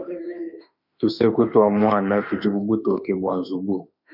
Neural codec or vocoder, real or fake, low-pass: autoencoder, 48 kHz, 32 numbers a frame, DAC-VAE, trained on Japanese speech; fake; 5.4 kHz